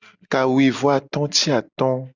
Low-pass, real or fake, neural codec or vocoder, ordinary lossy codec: 7.2 kHz; real; none; Opus, 64 kbps